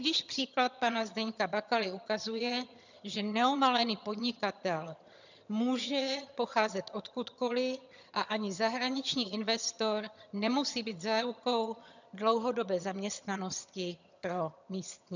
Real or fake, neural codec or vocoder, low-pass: fake; vocoder, 22.05 kHz, 80 mel bands, HiFi-GAN; 7.2 kHz